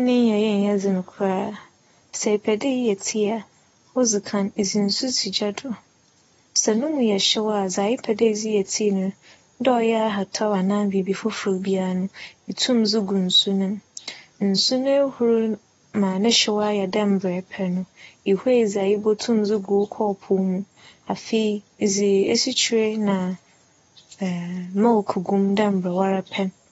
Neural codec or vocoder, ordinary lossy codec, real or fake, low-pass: none; AAC, 24 kbps; real; 19.8 kHz